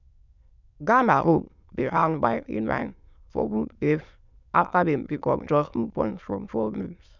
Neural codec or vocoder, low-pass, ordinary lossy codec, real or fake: autoencoder, 22.05 kHz, a latent of 192 numbers a frame, VITS, trained on many speakers; 7.2 kHz; none; fake